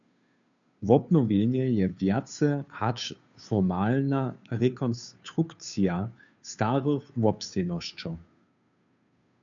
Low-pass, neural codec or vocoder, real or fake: 7.2 kHz; codec, 16 kHz, 2 kbps, FunCodec, trained on Chinese and English, 25 frames a second; fake